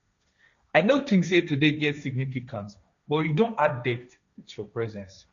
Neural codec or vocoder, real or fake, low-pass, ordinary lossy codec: codec, 16 kHz, 1.1 kbps, Voila-Tokenizer; fake; 7.2 kHz; none